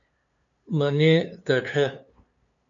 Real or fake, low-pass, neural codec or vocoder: fake; 7.2 kHz; codec, 16 kHz, 2 kbps, FunCodec, trained on LibriTTS, 25 frames a second